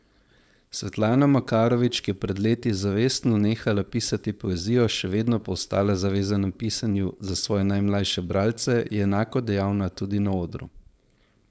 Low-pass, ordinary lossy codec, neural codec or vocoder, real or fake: none; none; codec, 16 kHz, 4.8 kbps, FACodec; fake